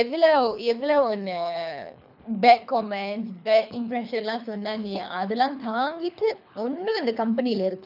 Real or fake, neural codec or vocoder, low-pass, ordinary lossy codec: fake; codec, 24 kHz, 3 kbps, HILCodec; 5.4 kHz; none